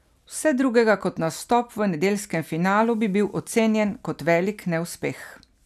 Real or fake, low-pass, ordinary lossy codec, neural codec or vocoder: real; 14.4 kHz; none; none